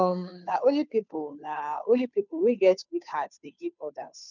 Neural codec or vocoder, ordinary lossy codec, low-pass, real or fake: codec, 16 kHz, 2 kbps, FunCodec, trained on Chinese and English, 25 frames a second; none; 7.2 kHz; fake